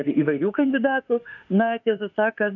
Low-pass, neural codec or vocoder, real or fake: 7.2 kHz; autoencoder, 48 kHz, 32 numbers a frame, DAC-VAE, trained on Japanese speech; fake